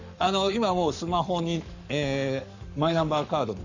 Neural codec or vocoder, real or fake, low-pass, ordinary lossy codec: codec, 44.1 kHz, 7.8 kbps, Pupu-Codec; fake; 7.2 kHz; none